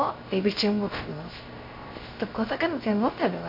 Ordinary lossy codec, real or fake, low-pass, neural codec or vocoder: MP3, 32 kbps; fake; 5.4 kHz; codec, 16 kHz, 0.3 kbps, FocalCodec